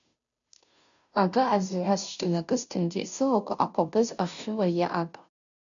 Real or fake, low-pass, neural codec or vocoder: fake; 7.2 kHz; codec, 16 kHz, 0.5 kbps, FunCodec, trained on Chinese and English, 25 frames a second